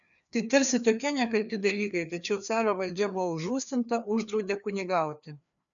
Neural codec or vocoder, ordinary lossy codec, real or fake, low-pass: codec, 16 kHz, 2 kbps, FreqCodec, larger model; MP3, 96 kbps; fake; 7.2 kHz